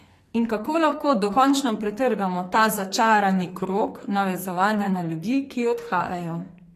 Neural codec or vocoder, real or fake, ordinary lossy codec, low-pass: codec, 44.1 kHz, 2.6 kbps, SNAC; fake; AAC, 48 kbps; 14.4 kHz